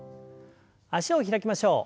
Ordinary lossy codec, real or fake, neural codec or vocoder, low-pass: none; real; none; none